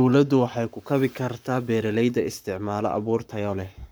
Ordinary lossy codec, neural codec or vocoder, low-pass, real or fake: none; codec, 44.1 kHz, 7.8 kbps, Pupu-Codec; none; fake